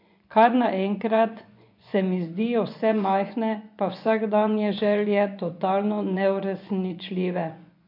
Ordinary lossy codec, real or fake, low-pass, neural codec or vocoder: MP3, 48 kbps; fake; 5.4 kHz; vocoder, 24 kHz, 100 mel bands, Vocos